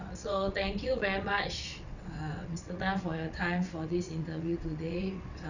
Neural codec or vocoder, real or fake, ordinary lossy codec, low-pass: vocoder, 22.05 kHz, 80 mel bands, WaveNeXt; fake; none; 7.2 kHz